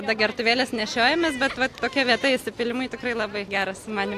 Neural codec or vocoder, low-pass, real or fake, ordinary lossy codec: none; 14.4 kHz; real; AAC, 64 kbps